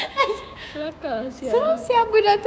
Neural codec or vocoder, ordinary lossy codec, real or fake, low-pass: codec, 16 kHz, 6 kbps, DAC; none; fake; none